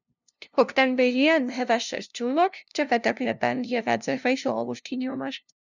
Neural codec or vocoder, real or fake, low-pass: codec, 16 kHz, 0.5 kbps, FunCodec, trained on LibriTTS, 25 frames a second; fake; 7.2 kHz